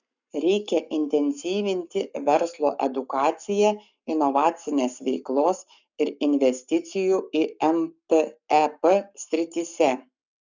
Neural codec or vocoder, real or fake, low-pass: codec, 44.1 kHz, 7.8 kbps, Pupu-Codec; fake; 7.2 kHz